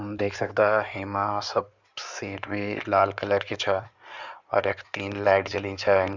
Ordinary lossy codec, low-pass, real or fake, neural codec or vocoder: Opus, 64 kbps; 7.2 kHz; fake; codec, 16 kHz in and 24 kHz out, 2.2 kbps, FireRedTTS-2 codec